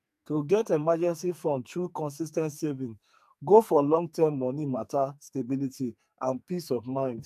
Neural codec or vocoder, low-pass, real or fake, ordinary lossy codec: codec, 44.1 kHz, 2.6 kbps, SNAC; 14.4 kHz; fake; AAC, 96 kbps